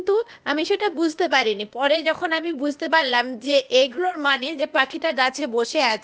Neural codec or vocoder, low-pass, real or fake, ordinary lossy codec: codec, 16 kHz, 0.8 kbps, ZipCodec; none; fake; none